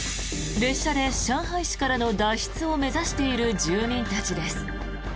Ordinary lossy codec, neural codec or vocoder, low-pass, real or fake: none; none; none; real